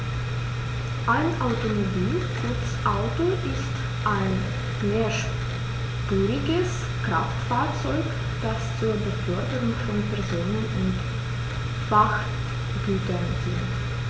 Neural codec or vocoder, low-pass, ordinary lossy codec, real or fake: none; none; none; real